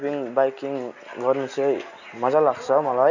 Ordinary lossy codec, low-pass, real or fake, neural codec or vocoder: none; 7.2 kHz; real; none